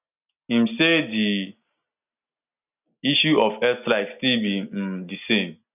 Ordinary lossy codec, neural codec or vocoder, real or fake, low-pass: none; none; real; 3.6 kHz